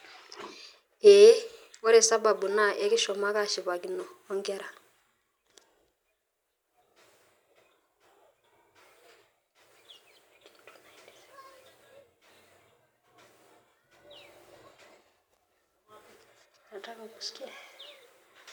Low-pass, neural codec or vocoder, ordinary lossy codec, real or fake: none; none; none; real